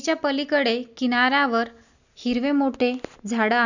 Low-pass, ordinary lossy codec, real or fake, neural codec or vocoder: 7.2 kHz; none; real; none